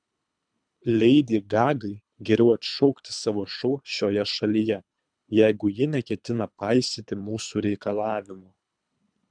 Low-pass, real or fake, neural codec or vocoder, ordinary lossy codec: 9.9 kHz; fake; codec, 24 kHz, 3 kbps, HILCodec; MP3, 96 kbps